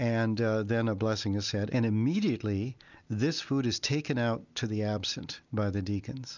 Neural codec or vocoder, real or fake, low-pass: none; real; 7.2 kHz